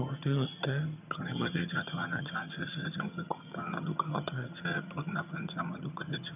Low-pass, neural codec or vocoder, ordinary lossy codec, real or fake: 3.6 kHz; vocoder, 22.05 kHz, 80 mel bands, HiFi-GAN; none; fake